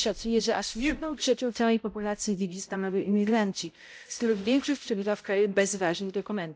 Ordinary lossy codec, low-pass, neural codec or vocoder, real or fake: none; none; codec, 16 kHz, 0.5 kbps, X-Codec, HuBERT features, trained on balanced general audio; fake